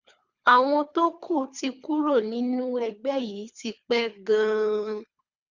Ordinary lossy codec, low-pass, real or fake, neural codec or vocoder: Opus, 64 kbps; 7.2 kHz; fake; codec, 24 kHz, 3 kbps, HILCodec